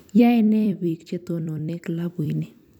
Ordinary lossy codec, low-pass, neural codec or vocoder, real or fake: none; 19.8 kHz; vocoder, 44.1 kHz, 128 mel bands every 256 samples, BigVGAN v2; fake